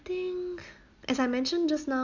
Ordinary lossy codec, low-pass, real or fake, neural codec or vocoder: none; 7.2 kHz; real; none